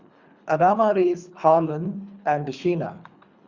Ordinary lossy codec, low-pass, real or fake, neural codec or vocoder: Opus, 64 kbps; 7.2 kHz; fake; codec, 24 kHz, 3 kbps, HILCodec